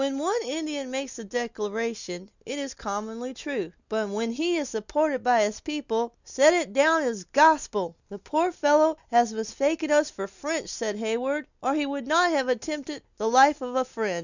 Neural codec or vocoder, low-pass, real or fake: none; 7.2 kHz; real